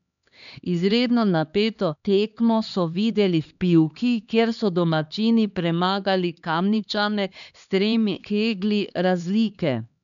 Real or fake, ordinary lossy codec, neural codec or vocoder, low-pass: fake; none; codec, 16 kHz, 2 kbps, X-Codec, HuBERT features, trained on LibriSpeech; 7.2 kHz